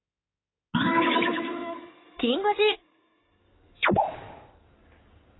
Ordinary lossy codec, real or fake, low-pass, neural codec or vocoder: AAC, 16 kbps; fake; 7.2 kHz; codec, 16 kHz in and 24 kHz out, 2.2 kbps, FireRedTTS-2 codec